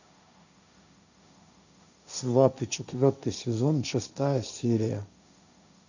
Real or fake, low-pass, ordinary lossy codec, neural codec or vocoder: fake; 7.2 kHz; none; codec, 16 kHz, 1.1 kbps, Voila-Tokenizer